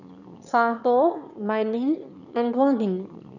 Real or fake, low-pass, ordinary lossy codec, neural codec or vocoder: fake; 7.2 kHz; none; autoencoder, 22.05 kHz, a latent of 192 numbers a frame, VITS, trained on one speaker